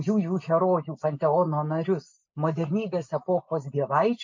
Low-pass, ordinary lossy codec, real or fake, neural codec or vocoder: 7.2 kHz; MP3, 48 kbps; real; none